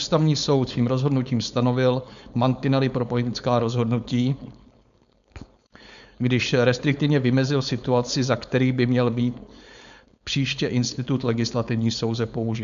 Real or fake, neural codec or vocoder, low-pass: fake; codec, 16 kHz, 4.8 kbps, FACodec; 7.2 kHz